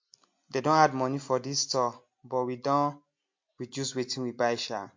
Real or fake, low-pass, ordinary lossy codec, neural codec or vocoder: real; 7.2 kHz; MP3, 48 kbps; none